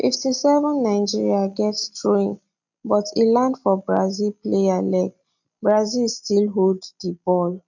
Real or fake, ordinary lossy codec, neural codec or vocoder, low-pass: real; none; none; 7.2 kHz